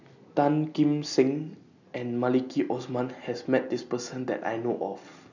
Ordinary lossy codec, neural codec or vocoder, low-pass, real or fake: none; none; 7.2 kHz; real